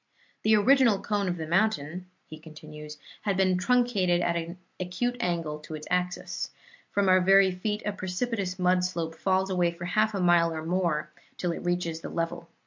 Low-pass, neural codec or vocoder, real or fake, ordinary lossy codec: 7.2 kHz; none; real; MP3, 48 kbps